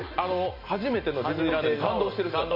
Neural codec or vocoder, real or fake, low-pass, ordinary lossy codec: none; real; 5.4 kHz; MP3, 32 kbps